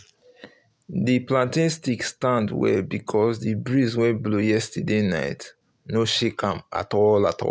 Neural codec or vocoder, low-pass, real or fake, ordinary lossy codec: none; none; real; none